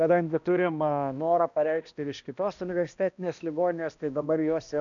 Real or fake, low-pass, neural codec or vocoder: fake; 7.2 kHz; codec, 16 kHz, 1 kbps, X-Codec, HuBERT features, trained on balanced general audio